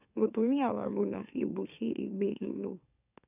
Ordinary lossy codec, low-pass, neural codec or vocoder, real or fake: none; 3.6 kHz; autoencoder, 44.1 kHz, a latent of 192 numbers a frame, MeloTTS; fake